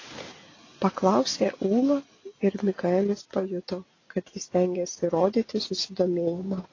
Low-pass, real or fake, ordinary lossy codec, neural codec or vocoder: 7.2 kHz; real; AAC, 32 kbps; none